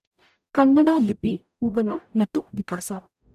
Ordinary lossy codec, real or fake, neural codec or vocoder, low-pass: none; fake; codec, 44.1 kHz, 0.9 kbps, DAC; 14.4 kHz